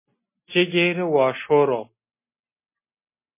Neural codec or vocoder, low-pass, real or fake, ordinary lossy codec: none; 3.6 kHz; real; MP3, 24 kbps